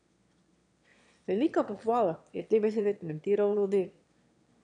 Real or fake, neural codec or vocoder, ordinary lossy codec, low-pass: fake; autoencoder, 22.05 kHz, a latent of 192 numbers a frame, VITS, trained on one speaker; none; 9.9 kHz